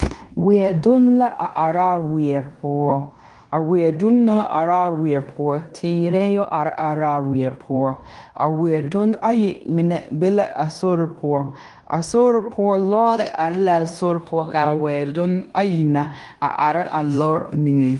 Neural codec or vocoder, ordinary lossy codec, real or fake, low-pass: codec, 16 kHz in and 24 kHz out, 0.9 kbps, LongCat-Audio-Codec, fine tuned four codebook decoder; Opus, 32 kbps; fake; 10.8 kHz